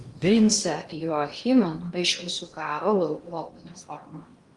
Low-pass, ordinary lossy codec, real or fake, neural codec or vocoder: 10.8 kHz; Opus, 32 kbps; fake; codec, 16 kHz in and 24 kHz out, 0.8 kbps, FocalCodec, streaming, 65536 codes